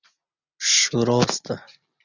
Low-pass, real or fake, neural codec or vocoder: 7.2 kHz; real; none